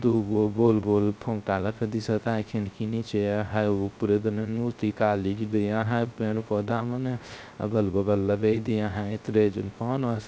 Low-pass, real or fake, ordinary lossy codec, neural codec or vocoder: none; fake; none; codec, 16 kHz, 0.3 kbps, FocalCodec